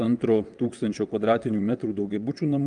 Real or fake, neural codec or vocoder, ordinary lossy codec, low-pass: fake; vocoder, 22.05 kHz, 80 mel bands, WaveNeXt; Opus, 32 kbps; 9.9 kHz